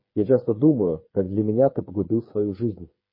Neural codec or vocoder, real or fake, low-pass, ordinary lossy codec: codec, 16 kHz, 8 kbps, FreqCodec, smaller model; fake; 5.4 kHz; MP3, 24 kbps